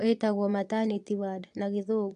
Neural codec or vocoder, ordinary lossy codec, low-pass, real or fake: vocoder, 22.05 kHz, 80 mel bands, Vocos; AAC, 64 kbps; 9.9 kHz; fake